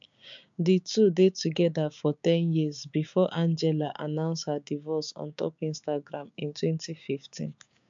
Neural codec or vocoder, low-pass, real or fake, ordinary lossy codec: codec, 16 kHz, 6 kbps, DAC; 7.2 kHz; fake; MP3, 64 kbps